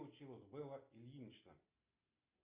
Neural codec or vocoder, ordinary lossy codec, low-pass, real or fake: none; Opus, 64 kbps; 3.6 kHz; real